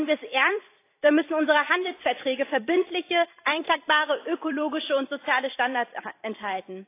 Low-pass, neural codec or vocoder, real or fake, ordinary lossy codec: 3.6 kHz; vocoder, 44.1 kHz, 128 mel bands every 256 samples, BigVGAN v2; fake; AAC, 24 kbps